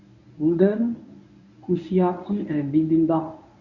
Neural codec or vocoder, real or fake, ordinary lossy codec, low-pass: codec, 24 kHz, 0.9 kbps, WavTokenizer, medium speech release version 1; fake; none; 7.2 kHz